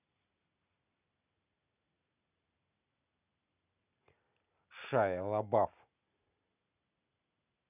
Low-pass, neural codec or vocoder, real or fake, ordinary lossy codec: 3.6 kHz; none; real; none